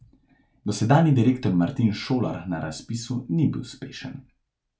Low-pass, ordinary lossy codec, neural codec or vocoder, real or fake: none; none; none; real